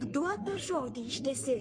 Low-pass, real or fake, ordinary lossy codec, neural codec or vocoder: 9.9 kHz; fake; MP3, 48 kbps; codec, 44.1 kHz, 3.4 kbps, Pupu-Codec